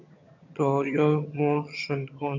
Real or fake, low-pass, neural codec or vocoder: fake; 7.2 kHz; vocoder, 22.05 kHz, 80 mel bands, HiFi-GAN